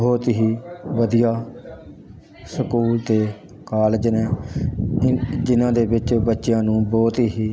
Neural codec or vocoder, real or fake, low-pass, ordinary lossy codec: none; real; none; none